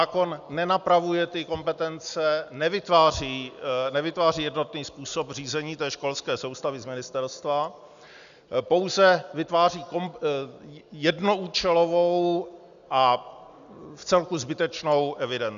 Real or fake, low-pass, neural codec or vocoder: real; 7.2 kHz; none